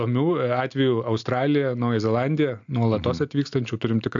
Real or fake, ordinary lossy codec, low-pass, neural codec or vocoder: real; AAC, 64 kbps; 7.2 kHz; none